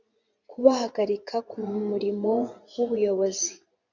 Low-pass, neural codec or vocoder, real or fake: 7.2 kHz; vocoder, 24 kHz, 100 mel bands, Vocos; fake